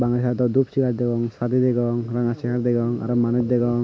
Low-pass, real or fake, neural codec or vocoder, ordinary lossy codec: none; real; none; none